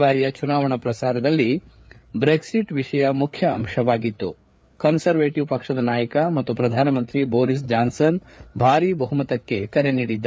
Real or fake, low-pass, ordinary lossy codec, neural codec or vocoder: fake; none; none; codec, 16 kHz, 4 kbps, FreqCodec, larger model